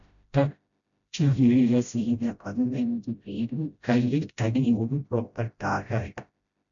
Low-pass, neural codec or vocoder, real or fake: 7.2 kHz; codec, 16 kHz, 0.5 kbps, FreqCodec, smaller model; fake